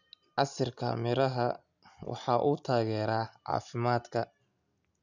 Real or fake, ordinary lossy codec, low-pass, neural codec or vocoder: real; none; 7.2 kHz; none